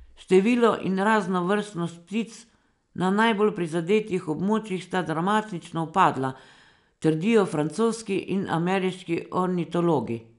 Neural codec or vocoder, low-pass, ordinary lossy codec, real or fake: none; 10.8 kHz; none; real